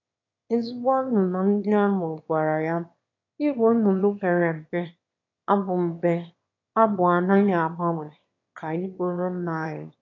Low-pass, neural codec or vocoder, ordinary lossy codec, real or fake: 7.2 kHz; autoencoder, 22.05 kHz, a latent of 192 numbers a frame, VITS, trained on one speaker; AAC, 48 kbps; fake